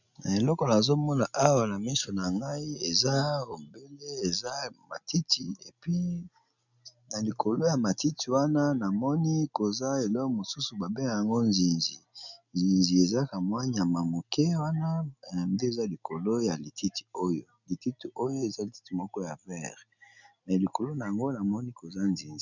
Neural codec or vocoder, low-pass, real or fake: none; 7.2 kHz; real